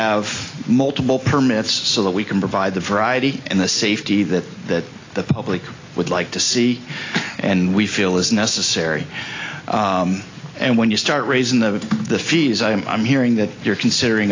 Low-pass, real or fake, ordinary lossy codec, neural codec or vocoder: 7.2 kHz; real; AAC, 32 kbps; none